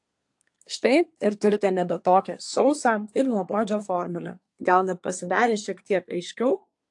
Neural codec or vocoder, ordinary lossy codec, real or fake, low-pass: codec, 24 kHz, 1 kbps, SNAC; AAC, 64 kbps; fake; 10.8 kHz